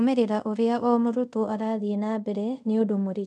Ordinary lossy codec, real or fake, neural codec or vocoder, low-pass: none; fake; codec, 24 kHz, 0.5 kbps, DualCodec; none